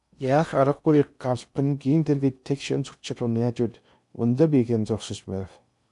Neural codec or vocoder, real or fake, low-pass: codec, 16 kHz in and 24 kHz out, 0.6 kbps, FocalCodec, streaming, 2048 codes; fake; 10.8 kHz